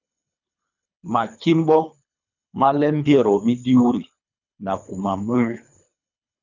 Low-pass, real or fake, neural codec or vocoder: 7.2 kHz; fake; codec, 24 kHz, 3 kbps, HILCodec